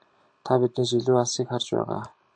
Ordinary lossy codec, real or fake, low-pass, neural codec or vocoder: AAC, 64 kbps; real; 9.9 kHz; none